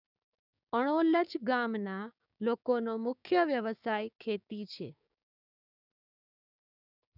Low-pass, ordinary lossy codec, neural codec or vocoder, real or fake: 5.4 kHz; none; codec, 16 kHz, 6 kbps, DAC; fake